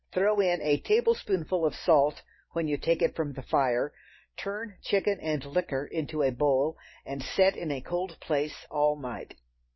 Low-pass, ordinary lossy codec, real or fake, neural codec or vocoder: 7.2 kHz; MP3, 24 kbps; real; none